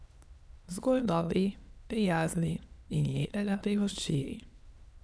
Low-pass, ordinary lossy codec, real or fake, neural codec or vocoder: none; none; fake; autoencoder, 22.05 kHz, a latent of 192 numbers a frame, VITS, trained on many speakers